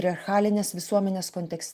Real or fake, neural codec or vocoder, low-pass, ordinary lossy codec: real; none; 14.4 kHz; Opus, 32 kbps